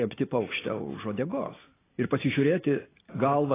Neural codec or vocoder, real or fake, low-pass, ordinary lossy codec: none; real; 3.6 kHz; AAC, 16 kbps